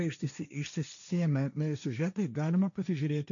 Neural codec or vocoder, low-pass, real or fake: codec, 16 kHz, 1.1 kbps, Voila-Tokenizer; 7.2 kHz; fake